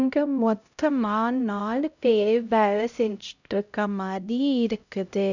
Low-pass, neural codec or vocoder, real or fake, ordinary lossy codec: 7.2 kHz; codec, 16 kHz, 0.5 kbps, X-Codec, HuBERT features, trained on LibriSpeech; fake; none